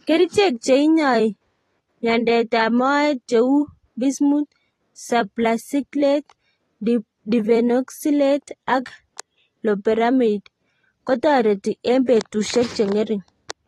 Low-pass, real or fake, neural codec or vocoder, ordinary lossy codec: 19.8 kHz; fake; vocoder, 44.1 kHz, 128 mel bands every 256 samples, BigVGAN v2; AAC, 32 kbps